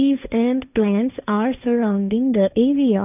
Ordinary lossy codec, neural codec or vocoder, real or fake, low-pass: none; codec, 16 kHz, 1.1 kbps, Voila-Tokenizer; fake; 3.6 kHz